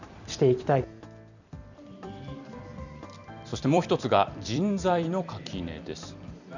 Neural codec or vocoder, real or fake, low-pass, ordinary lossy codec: vocoder, 44.1 kHz, 128 mel bands every 256 samples, BigVGAN v2; fake; 7.2 kHz; none